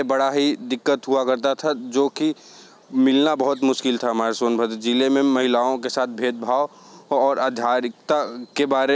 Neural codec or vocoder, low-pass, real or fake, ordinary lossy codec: none; none; real; none